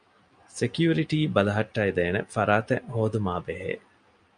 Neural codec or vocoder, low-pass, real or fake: none; 9.9 kHz; real